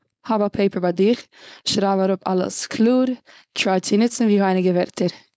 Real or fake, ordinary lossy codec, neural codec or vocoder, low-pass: fake; none; codec, 16 kHz, 4.8 kbps, FACodec; none